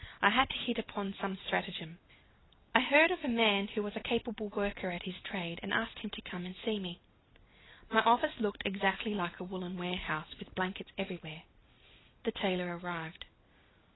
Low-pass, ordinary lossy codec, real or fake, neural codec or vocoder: 7.2 kHz; AAC, 16 kbps; real; none